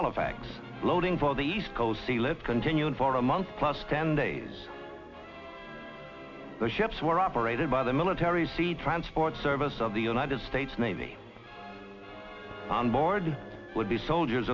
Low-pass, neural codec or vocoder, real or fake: 7.2 kHz; none; real